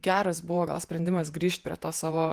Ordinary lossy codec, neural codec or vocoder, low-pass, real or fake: Opus, 24 kbps; vocoder, 48 kHz, 128 mel bands, Vocos; 14.4 kHz; fake